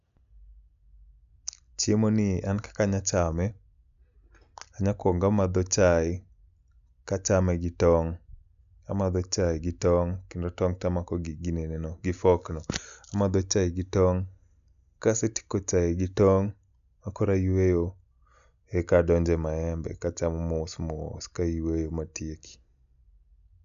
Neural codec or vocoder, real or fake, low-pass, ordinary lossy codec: none; real; 7.2 kHz; none